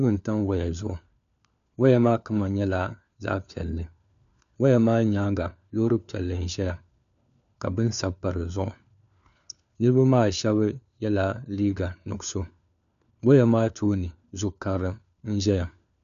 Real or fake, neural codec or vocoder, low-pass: fake; codec, 16 kHz, 4 kbps, FunCodec, trained on LibriTTS, 50 frames a second; 7.2 kHz